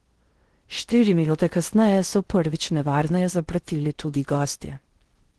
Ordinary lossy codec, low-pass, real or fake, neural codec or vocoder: Opus, 16 kbps; 10.8 kHz; fake; codec, 16 kHz in and 24 kHz out, 0.6 kbps, FocalCodec, streaming, 4096 codes